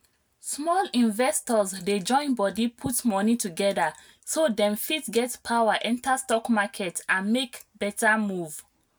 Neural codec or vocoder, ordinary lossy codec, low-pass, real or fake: none; none; none; real